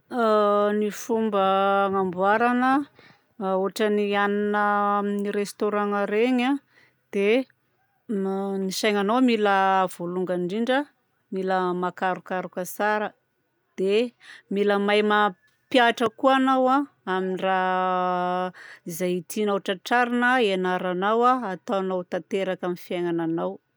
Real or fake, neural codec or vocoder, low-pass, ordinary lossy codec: real; none; none; none